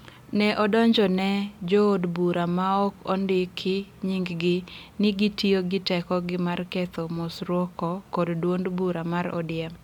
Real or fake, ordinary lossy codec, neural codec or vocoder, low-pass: real; MP3, 96 kbps; none; 19.8 kHz